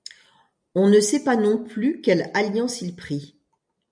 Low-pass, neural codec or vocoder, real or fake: 9.9 kHz; none; real